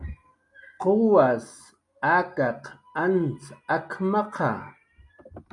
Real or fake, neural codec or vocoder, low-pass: real; none; 10.8 kHz